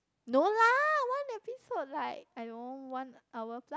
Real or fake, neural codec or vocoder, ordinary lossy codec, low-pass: real; none; none; none